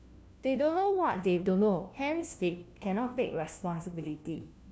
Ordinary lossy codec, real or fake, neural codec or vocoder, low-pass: none; fake; codec, 16 kHz, 1 kbps, FunCodec, trained on LibriTTS, 50 frames a second; none